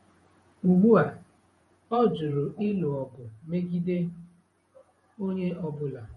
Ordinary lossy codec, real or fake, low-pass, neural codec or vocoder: MP3, 48 kbps; fake; 19.8 kHz; vocoder, 48 kHz, 128 mel bands, Vocos